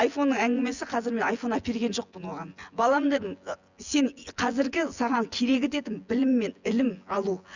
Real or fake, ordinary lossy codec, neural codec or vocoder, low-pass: fake; Opus, 64 kbps; vocoder, 24 kHz, 100 mel bands, Vocos; 7.2 kHz